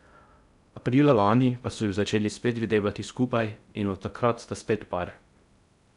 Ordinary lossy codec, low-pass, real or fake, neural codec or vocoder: none; 10.8 kHz; fake; codec, 16 kHz in and 24 kHz out, 0.6 kbps, FocalCodec, streaming, 2048 codes